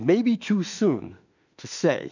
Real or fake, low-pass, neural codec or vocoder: fake; 7.2 kHz; autoencoder, 48 kHz, 32 numbers a frame, DAC-VAE, trained on Japanese speech